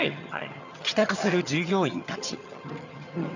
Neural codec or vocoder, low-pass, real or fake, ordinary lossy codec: vocoder, 22.05 kHz, 80 mel bands, HiFi-GAN; 7.2 kHz; fake; none